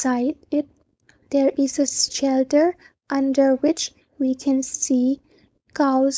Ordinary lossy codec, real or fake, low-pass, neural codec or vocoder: none; fake; none; codec, 16 kHz, 4.8 kbps, FACodec